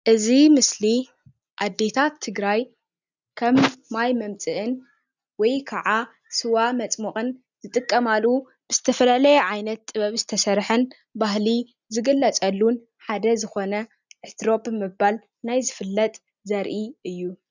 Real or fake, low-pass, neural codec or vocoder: real; 7.2 kHz; none